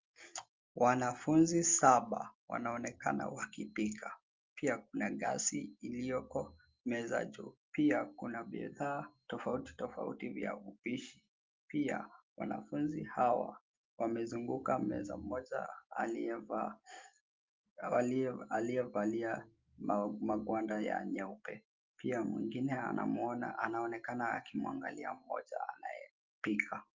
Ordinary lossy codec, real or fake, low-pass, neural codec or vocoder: Opus, 32 kbps; real; 7.2 kHz; none